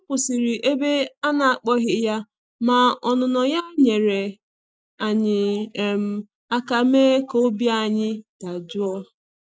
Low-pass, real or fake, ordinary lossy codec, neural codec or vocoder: none; real; none; none